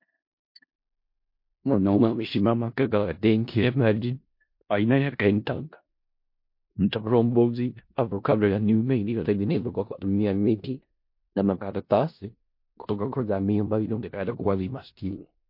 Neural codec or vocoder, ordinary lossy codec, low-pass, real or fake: codec, 16 kHz in and 24 kHz out, 0.4 kbps, LongCat-Audio-Codec, four codebook decoder; MP3, 32 kbps; 5.4 kHz; fake